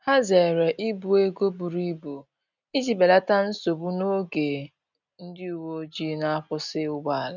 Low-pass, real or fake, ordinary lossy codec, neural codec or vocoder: 7.2 kHz; real; none; none